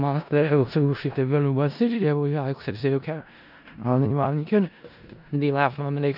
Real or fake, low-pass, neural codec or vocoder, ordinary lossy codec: fake; 5.4 kHz; codec, 16 kHz in and 24 kHz out, 0.4 kbps, LongCat-Audio-Codec, four codebook decoder; none